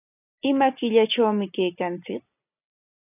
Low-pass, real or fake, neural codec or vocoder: 3.6 kHz; real; none